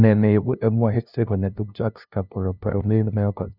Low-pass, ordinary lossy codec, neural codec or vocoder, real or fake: 5.4 kHz; none; codec, 16 kHz, 0.5 kbps, FunCodec, trained on LibriTTS, 25 frames a second; fake